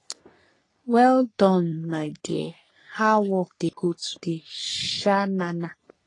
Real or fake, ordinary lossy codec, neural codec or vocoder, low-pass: fake; AAC, 32 kbps; codec, 44.1 kHz, 3.4 kbps, Pupu-Codec; 10.8 kHz